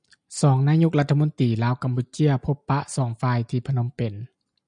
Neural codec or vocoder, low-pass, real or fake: none; 9.9 kHz; real